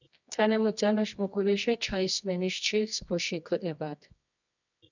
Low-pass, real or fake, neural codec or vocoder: 7.2 kHz; fake; codec, 24 kHz, 0.9 kbps, WavTokenizer, medium music audio release